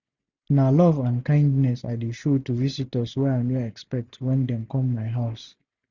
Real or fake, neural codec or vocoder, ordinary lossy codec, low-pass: real; none; none; 7.2 kHz